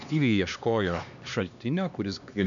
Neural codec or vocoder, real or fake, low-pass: codec, 16 kHz, 2 kbps, X-Codec, HuBERT features, trained on LibriSpeech; fake; 7.2 kHz